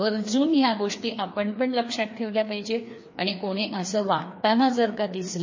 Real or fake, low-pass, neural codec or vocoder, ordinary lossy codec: fake; 7.2 kHz; codec, 16 kHz, 2 kbps, FreqCodec, larger model; MP3, 32 kbps